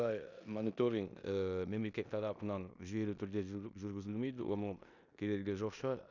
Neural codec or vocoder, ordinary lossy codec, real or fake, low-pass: codec, 16 kHz in and 24 kHz out, 0.9 kbps, LongCat-Audio-Codec, four codebook decoder; none; fake; 7.2 kHz